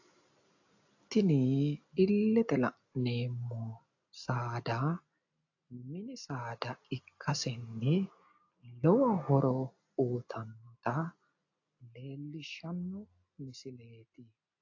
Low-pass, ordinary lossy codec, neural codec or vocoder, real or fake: 7.2 kHz; AAC, 48 kbps; none; real